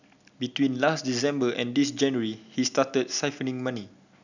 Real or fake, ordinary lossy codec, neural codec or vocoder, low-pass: real; none; none; 7.2 kHz